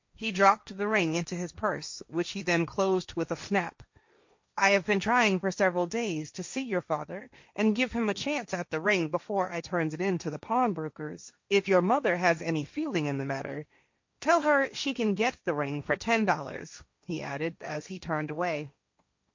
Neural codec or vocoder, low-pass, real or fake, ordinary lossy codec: codec, 16 kHz, 1.1 kbps, Voila-Tokenizer; 7.2 kHz; fake; MP3, 48 kbps